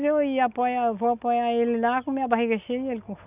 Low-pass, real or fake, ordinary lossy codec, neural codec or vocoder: 3.6 kHz; real; none; none